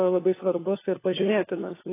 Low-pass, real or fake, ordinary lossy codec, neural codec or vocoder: 3.6 kHz; fake; AAC, 16 kbps; codec, 16 kHz, 4 kbps, FunCodec, trained on LibriTTS, 50 frames a second